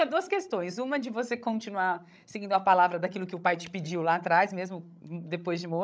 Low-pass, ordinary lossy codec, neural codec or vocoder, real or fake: none; none; codec, 16 kHz, 16 kbps, FreqCodec, larger model; fake